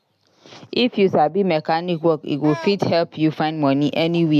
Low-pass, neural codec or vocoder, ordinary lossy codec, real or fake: 14.4 kHz; none; none; real